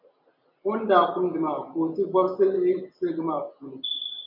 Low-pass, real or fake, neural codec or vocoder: 5.4 kHz; real; none